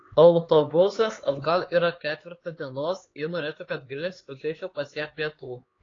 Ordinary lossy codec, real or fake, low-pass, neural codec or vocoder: AAC, 32 kbps; fake; 7.2 kHz; codec, 16 kHz, 4 kbps, X-Codec, HuBERT features, trained on LibriSpeech